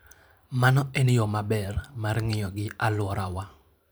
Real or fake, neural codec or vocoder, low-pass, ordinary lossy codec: fake; vocoder, 44.1 kHz, 128 mel bands every 512 samples, BigVGAN v2; none; none